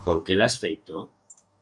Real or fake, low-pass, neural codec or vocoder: fake; 10.8 kHz; codec, 44.1 kHz, 2.6 kbps, DAC